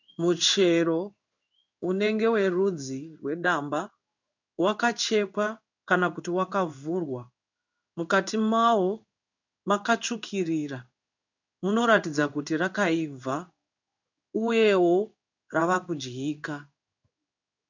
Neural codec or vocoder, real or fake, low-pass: codec, 16 kHz in and 24 kHz out, 1 kbps, XY-Tokenizer; fake; 7.2 kHz